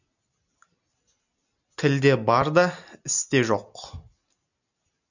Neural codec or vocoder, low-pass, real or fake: none; 7.2 kHz; real